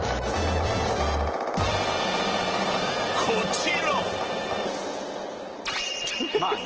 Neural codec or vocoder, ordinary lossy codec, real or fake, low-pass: none; Opus, 16 kbps; real; 7.2 kHz